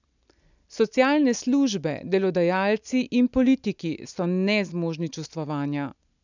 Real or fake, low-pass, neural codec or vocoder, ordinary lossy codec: real; 7.2 kHz; none; none